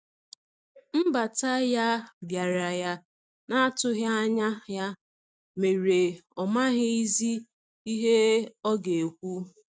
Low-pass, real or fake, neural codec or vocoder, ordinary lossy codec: none; real; none; none